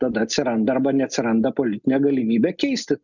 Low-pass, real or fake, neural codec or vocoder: 7.2 kHz; real; none